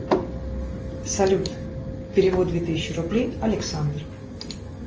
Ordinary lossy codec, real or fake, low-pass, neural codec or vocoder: Opus, 24 kbps; real; 7.2 kHz; none